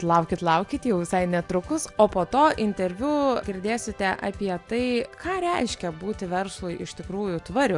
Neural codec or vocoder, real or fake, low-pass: none; real; 10.8 kHz